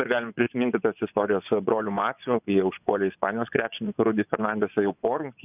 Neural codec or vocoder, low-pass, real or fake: vocoder, 24 kHz, 100 mel bands, Vocos; 3.6 kHz; fake